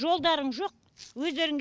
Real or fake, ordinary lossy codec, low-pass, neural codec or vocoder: real; none; none; none